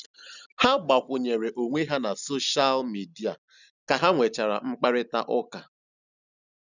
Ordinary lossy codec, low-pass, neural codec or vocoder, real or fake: none; 7.2 kHz; none; real